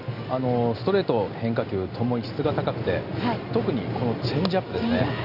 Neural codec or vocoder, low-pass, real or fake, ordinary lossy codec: none; 5.4 kHz; real; none